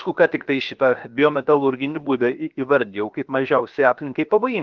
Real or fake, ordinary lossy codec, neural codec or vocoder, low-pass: fake; Opus, 32 kbps; codec, 16 kHz, 0.7 kbps, FocalCodec; 7.2 kHz